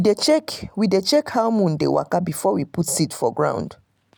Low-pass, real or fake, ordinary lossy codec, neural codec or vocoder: none; real; none; none